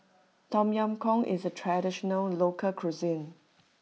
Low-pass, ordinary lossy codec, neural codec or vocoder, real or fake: none; none; none; real